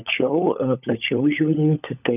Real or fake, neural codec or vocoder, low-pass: fake; codec, 16 kHz, 16 kbps, FunCodec, trained on LibriTTS, 50 frames a second; 3.6 kHz